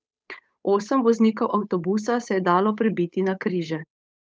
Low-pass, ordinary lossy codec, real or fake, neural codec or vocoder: none; none; fake; codec, 16 kHz, 8 kbps, FunCodec, trained on Chinese and English, 25 frames a second